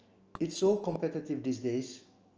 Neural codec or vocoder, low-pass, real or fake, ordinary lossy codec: autoencoder, 48 kHz, 128 numbers a frame, DAC-VAE, trained on Japanese speech; 7.2 kHz; fake; Opus, 24 kbps